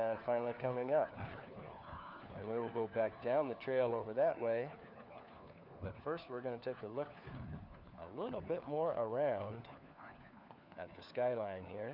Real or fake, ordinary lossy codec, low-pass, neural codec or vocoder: fake; Opus, 64 kbps; 5.4 kHz; codec, 16 kHz, 4 kbps, FunCodec, trained on LibriTTS, 50 frames a second